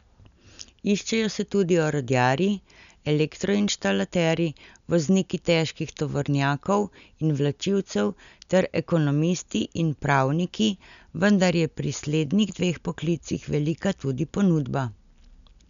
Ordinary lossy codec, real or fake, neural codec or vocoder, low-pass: none; real; none; 7.2 kHz